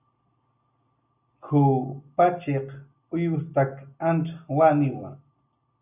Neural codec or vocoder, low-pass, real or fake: none; 3.6 kHz; real